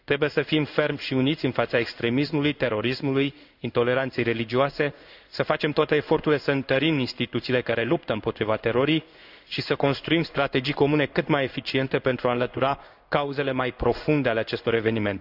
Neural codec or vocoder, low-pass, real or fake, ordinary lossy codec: codec, 16 kHz in and 24 kHz out, 1 kbps, XY-Tokenizer; 5.4 kHz; fake; none